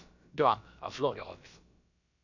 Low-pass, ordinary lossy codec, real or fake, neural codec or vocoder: 7.2 kHz; none; fake; codec, 16 kHz, about 1 kbps, DyCAST, with the encoder's durations